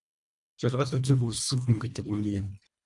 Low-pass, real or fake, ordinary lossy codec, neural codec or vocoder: 10.8 kHz; fake; MP3, 96 kbps; codec, 24 kHz, 1.5 kbps, HILCodec